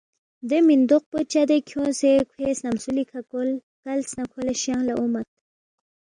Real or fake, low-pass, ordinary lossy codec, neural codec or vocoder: real; 9.9 kHz; MP3, 96 kbps; none